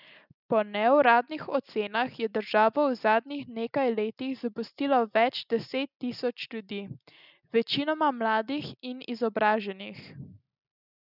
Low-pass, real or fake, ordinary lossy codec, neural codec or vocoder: 5.4 kHz; real; none; none